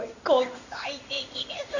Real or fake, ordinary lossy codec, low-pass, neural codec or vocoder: fake; none; 7.2 kHz; codec, 16 kHz in and 24 kHz out, 1 kbps, XY-Tokenizer